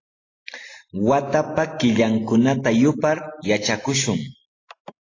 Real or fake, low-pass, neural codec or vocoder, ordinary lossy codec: real; 7.2 kHz; none; AAC, 32 kbps